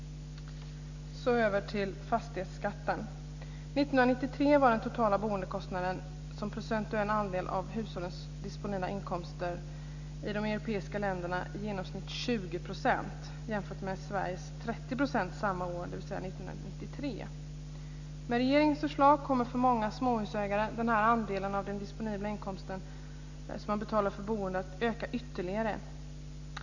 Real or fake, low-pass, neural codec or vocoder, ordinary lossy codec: real; 7.2 kHz; none; none